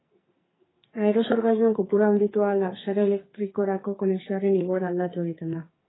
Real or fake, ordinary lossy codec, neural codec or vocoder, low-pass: fake; AAC, 16 kbps; codec, 44.1 kHz, 2.6 kbps, SNAC; 7.2 kHz